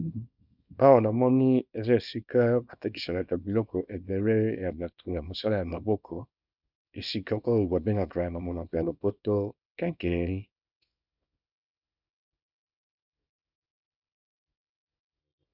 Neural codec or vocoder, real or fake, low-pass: codec, 24 kHz, 0.9 kbps, WavTokenizer, small release; fake; 5.4 kHz